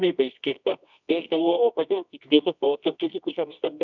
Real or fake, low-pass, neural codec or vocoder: fake; 7.2 kHz; codec, 24 kHz, 0.9 kbps, WavTokenizer, medium music audio release